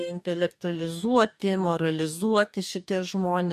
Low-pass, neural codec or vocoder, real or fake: 14.4 kHz; codec, 44.1 kHz, 2.6 kbps, DAC; fake